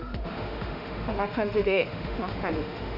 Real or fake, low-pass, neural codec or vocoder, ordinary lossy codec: fake; 5.4 kHz; autoencoder, 48 kHz, 32 numbers a frame, DAC-VAE, trained on Japanese speech; none